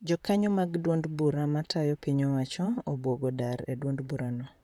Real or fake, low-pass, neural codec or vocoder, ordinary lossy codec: fake; 19.8 kHz; vocoder, 44.1 kHz, 128 mel bands, Pupu-Vocoder; none